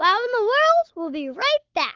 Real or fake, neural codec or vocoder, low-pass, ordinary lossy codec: fake; codec, 44.1 kHz, 7.8 kbps, Pupu-Codec; 7.2 kHz; Opus, 32 kbps